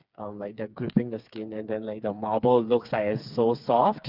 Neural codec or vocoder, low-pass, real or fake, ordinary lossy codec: codec, 16 kHz, 4 kbps, FreqCodec, smaller model; 5.4 kHz; fake; none